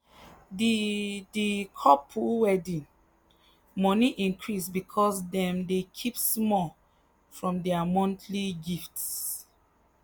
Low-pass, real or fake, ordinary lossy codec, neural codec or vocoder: none; real; none; none